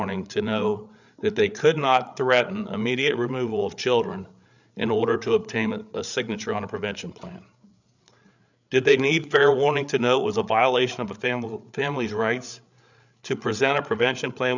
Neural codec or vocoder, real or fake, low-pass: codec, 16 kHz, 8 kbps, FreqCodec, larger model; fake; 7.2 kHz